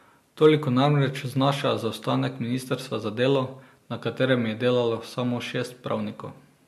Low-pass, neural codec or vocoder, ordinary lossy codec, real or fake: 14.4 kHz; none; MP3, 64 kbps; real